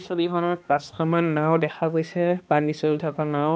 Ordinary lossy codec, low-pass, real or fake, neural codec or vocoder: none; none; fake; codec, 16 kHz, 2 kbps, X-Codec, HuBERT features, trained on balanced general audio